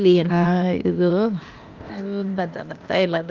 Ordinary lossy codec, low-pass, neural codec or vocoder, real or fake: Opus, 24 kbps; 7.2 kHz; codec, 16 kHz, 0.8 kbps, ZipCodec; fake